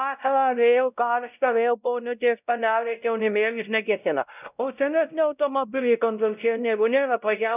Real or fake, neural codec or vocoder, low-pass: fake; codec, 16 kHz, 0.5 kbps, X-Codec, WavLM features, trained on Multilingual LibriSpeech; 3.6 kHz